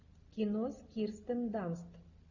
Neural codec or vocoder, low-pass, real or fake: none; 7.2 kHz; real